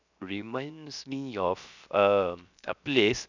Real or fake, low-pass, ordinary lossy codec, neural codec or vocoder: fake; 7.2 kHz; none; codec, 16 kHz, 0.7 kbps, FocalCodec